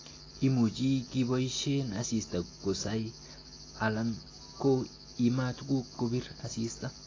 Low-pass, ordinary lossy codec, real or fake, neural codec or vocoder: 7.2 kHz; AAC, 32 kbps; real; none